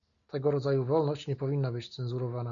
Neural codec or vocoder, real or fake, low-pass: none; real; 7.2 kHz